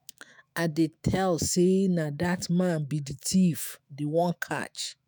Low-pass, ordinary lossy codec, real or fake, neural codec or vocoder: none; none; fake; autoencoder, 48 kHz, 128 numbers a frame, DAC-VAE, trained on Japanese speech